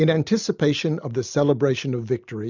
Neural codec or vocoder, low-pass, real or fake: none; 7.2 kHz; real